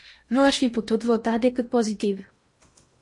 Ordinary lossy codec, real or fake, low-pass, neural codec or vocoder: MP3, 48 kbps; fake; 10.8 kHz; codec, 16 kHz in and 24 kHz out, 0.8 kbps, FocalCodec, streaming, 65536 codes